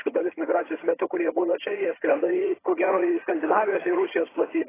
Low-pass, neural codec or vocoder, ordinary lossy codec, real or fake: 3.6 kHz; vocoder, 22.05 kHz, 80 mel bands, HiFi-GAN; AAC, 16 kbps; fake